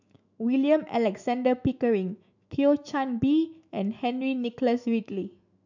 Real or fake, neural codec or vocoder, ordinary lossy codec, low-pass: fake; autoencoder, 48 kHz, 128 numbers a frame, DAC-VAE, trained on Japanese speech; none; 7.2 kHz